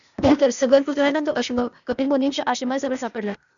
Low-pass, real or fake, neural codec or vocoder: 7.2 kHz; fake; codec, 16 kHz, 0.8 kbps, ZipCodec